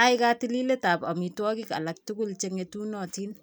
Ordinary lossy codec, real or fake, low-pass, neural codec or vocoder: none; real; none; none